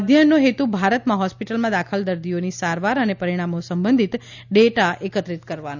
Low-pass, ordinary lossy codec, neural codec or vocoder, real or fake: 7.2 kHz; none; none; real